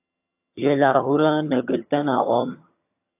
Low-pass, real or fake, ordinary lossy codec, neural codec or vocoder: 3.6 kHz; fake; AAC, 24 kbps; vocoder, 22.05 kHz, 80 mel bands, HiFi-GAN